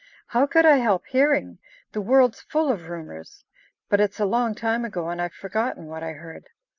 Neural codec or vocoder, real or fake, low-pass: none; real; 7.2 kHz